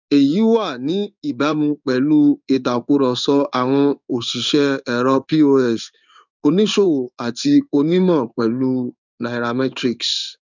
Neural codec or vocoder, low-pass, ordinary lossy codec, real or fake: codec, 16 kHz in and 24 kHz out, 1 kbps, XY-Tokenizer; 7.2 kHz; none; fake